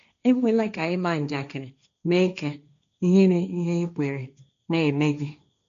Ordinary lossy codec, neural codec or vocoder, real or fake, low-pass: none; codec, 16 kHz, 1.1 kbps, Voila-Tokenizer; fake; 7.2 kHz